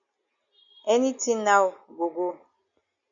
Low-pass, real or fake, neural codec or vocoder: 7.2 kHz; real; none